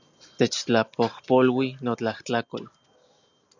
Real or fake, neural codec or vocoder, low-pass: real; none; 7.2 kHz